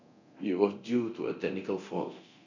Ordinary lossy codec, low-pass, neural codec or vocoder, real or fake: none; 7.2 kHz; codec, 24 kHz, 0.9 kbps, DualCodec; fake